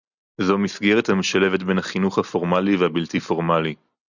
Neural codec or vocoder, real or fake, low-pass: none; real; 7.2 kHz